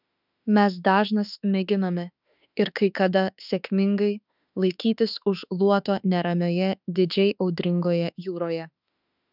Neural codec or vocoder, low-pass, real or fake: autoencoder, 48 kHz, 32 numbers a frame, DAC-VAE, trained on Japanese speech; 5.4 kHz; fake